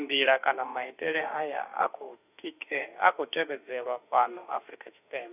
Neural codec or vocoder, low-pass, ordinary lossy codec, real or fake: autoencoder, 48 kHz, 32 numbers a frame, DAC-VAE, trained on Japanese speech; 3.6 kHz; none; fake